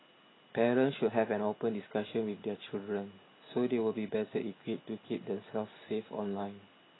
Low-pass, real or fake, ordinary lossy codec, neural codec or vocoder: 7.2 kHz; real; AAC, 16 kbps; none